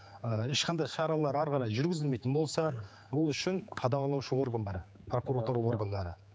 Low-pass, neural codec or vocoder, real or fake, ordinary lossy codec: none; codec, 16 kHz, 4 kbps, X-Codec, HuBERT features, trained on general audio; fake; none